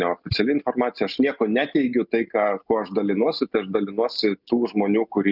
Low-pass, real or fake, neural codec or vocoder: 5.4 kHz; real; none